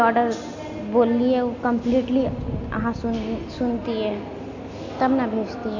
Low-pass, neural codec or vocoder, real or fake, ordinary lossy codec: 7.2 kHz; none; real; AAC, 48 kbps